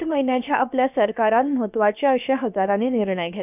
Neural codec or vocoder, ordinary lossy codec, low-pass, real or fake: codec, 16 kHz, about 1 kbps, DyCAST, with the encoder's durations; none; 3.6 kHz; fake